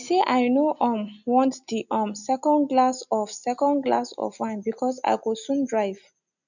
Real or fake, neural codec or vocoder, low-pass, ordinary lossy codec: real; none; 7.2 kHz; none